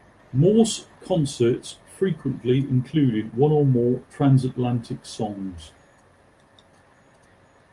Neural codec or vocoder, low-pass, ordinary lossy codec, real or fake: none; 10.8 kHz; Opus, 32 kbps; real